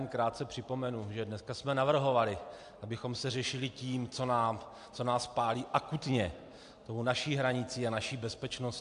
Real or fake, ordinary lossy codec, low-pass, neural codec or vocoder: real; AAC, 64 kbps; 10.8 kHz; none